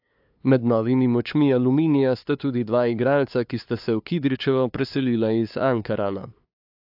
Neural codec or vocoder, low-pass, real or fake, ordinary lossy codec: codec, 16 kHz, 2 kbps, FunCodec, trained on LibriTTS, 25 frames a second; 5.4 kHz; fake; AAC, 48 kbps